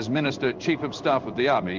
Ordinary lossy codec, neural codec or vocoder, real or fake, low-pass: Opus, 24 kbps; codec, 16 kHz in and 24 kHz out, 1 kbps, XY-Tokenizer; fake; 7.2 kHz